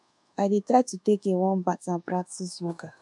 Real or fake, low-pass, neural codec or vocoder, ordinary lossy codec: fake; 10.8 kHz; codec, 24 kHz, 1.2 kbps, DualCodec; MP3, 96 kbps